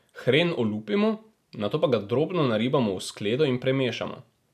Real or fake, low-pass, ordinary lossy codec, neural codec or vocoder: real; 14.4 kHz; none; none